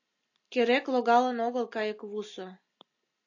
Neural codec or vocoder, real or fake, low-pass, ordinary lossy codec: none; real; 7.2 kHz; AAC, 48 kbps